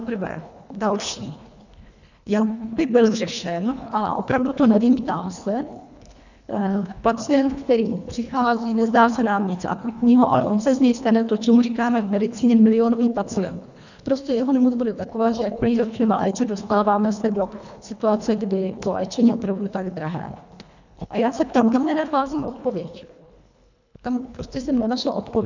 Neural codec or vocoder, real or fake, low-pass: codec, 24 kHz, 1.5 kbps, HILCodec; fake; 7.2 kHz